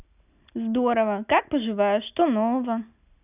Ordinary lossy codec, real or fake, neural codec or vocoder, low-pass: none; real; none; 3.6 kHz